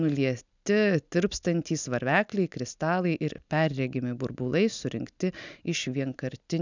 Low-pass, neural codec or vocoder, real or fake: 7.2 kHz; none; real